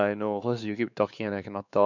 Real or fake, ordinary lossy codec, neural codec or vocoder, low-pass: fake; none; codec, 16 kHz, 4 kbps, X-Codec, WavLM features, trained on Multilingual LibriSpeech; 7.2 kHz